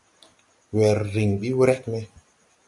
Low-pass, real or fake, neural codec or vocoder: 10.8 kHz; real; none